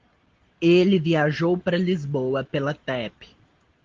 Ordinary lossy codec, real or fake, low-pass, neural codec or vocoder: Opus, 16 kbps; real; 7.2 kHz; none